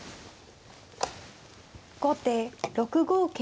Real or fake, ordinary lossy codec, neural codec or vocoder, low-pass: real; none; none; none